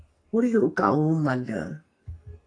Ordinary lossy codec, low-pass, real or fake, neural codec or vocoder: AAC, 32 kbps; 9.9 kHz; fake; codec, 44.1 kHz, 2.6 kbps, SNAC